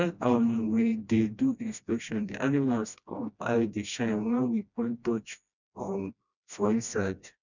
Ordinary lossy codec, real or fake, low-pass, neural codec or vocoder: none; fake; 7.2 kHz; codec, 16 kHz, 1 kbps, FreqCodec, smaller model